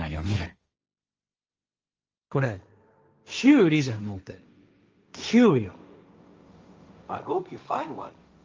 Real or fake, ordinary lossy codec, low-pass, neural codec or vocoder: fake; Opus, 24 kbps; 7.2 kHz; codec, 16 kHz, 1.1 kbps, Voila-Tokenizer